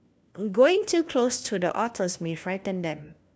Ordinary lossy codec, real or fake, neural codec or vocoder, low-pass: none; fake; codec, 16 kHz, 1 kbps, FunCodec, trained on LibriTTS, 50 frames a second; none